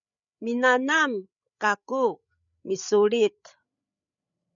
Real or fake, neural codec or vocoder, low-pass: fake; codec, 16 kHz, 8 kbps, FreqCodec, larger model; 7.2 kHz